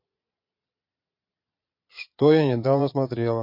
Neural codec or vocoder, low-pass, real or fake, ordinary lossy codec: vocoder, 22.05 kHz, 80 mel bands, Vocos; 5.4 kHz; fake; MP3, 32 kbps